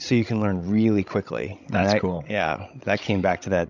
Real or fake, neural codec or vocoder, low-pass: fake; codec, 16 kHz, 16 kbps, FreqCodec, larger model; 7.2 kHz